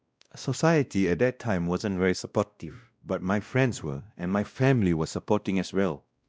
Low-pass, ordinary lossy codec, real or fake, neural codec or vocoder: none; none; fake; codec, 16 kHz, 1 kbps, X-Codec, WavLM features, trained on Multilingual LibriSpeech